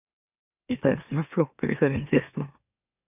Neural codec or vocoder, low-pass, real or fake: autoencoder, 44.1 kHz, a latent of 192 numbers a frame, MeloTTS; 3.6 kHz; fake